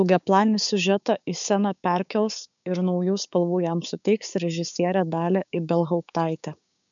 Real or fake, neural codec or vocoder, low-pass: fake; codec, 16 kHz, 6 kbps, DAC; 7.2 kHz